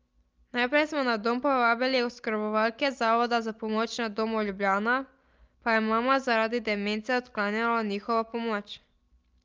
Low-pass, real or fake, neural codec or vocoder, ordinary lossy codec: 7.2 kHz; real; none; Opus, 24 kbps